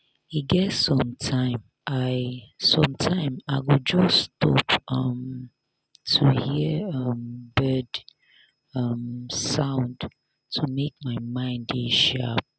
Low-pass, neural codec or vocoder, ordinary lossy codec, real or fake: none; none; none; real